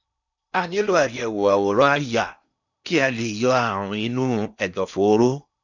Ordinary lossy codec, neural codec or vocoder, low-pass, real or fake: none; codec, 16 kHz in and 24 kHz out, 0.8 kbps, FocalCodec, streaming, 65536 codes; 7.2 kHz; fake